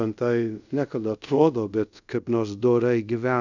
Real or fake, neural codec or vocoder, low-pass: fake; codec, 24 kHz, 0.5 kbps, DualCodec; 7.2 kHz